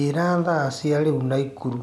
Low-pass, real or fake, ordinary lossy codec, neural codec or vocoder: none; real; none; none